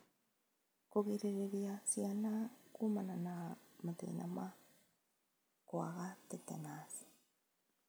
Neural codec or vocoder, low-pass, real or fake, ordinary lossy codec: none; none; real; none